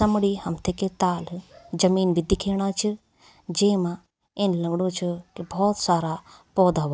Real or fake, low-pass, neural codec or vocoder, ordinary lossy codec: real; none; none; none